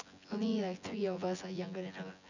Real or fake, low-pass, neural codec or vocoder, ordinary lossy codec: fake; 7.2 kHz; vocoder, 24 kHz, 100 mel bands, Vocos; none